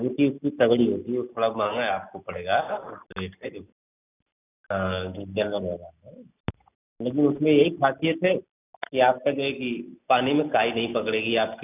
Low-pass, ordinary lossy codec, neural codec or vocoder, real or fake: 3.6 kHz; none; none; real